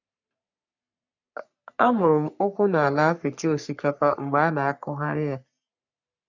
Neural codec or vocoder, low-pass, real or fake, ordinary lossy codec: codec, 44.1 kHz, 3.4 kbps, Pupu-Codec; 7.2 kHz; fake; none